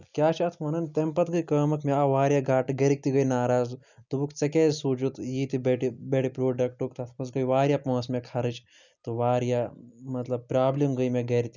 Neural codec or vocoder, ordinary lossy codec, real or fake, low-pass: none; none; real; 7.2 kHz